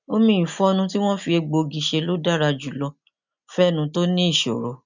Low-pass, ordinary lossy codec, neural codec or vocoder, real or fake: 7.2 kHz; none; none; real